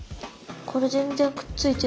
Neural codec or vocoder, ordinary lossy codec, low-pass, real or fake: none; none; none; real